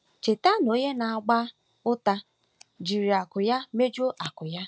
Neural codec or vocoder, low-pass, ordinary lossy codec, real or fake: none; none; none; real